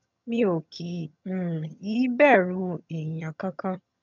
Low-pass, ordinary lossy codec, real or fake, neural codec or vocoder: 7.2 kHz; none; fake; vocoder, 22.05 kHz, 80 mel bands, HiFi-GAN